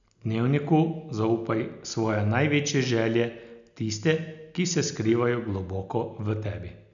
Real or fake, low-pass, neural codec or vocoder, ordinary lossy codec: real; 7.2 kHz; none; none